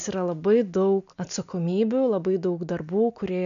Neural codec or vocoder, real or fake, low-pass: none; real; 7.2 kHz